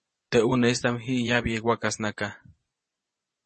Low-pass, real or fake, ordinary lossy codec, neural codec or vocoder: 10.8 kHz; fake; MP3, 32 kbps; vocoder, 24 kHz, 100 mel bands, Vocos